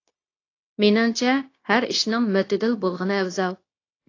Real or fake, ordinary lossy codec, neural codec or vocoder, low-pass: fake; AAC, 32 kbps; codec, 16 kHz, 0.9 kbps, LongCat-Audio-Codec; 7.2 kHz